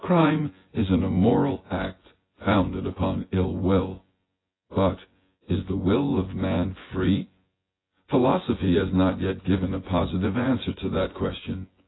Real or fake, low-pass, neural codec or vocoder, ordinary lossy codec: fake; 7.2 kHz; vocoder, 24 kHz, 100 mel bands, Vocos; AAC, 16 kbps